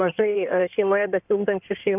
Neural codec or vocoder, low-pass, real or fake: codec, 16 kHz in and 24 kHz out, 2.2 kbps, FireRedTTS-2 codec; 3.6 kHz; fake